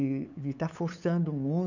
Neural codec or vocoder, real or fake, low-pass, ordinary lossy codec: codec, 16 kHz, 8 kbps, FunCodec, trained on LibriTTS, 25 frames a second; fake; 7.2 kHz; none